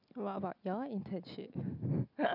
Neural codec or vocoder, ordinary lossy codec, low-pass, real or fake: none; none; 5.4 kHz; real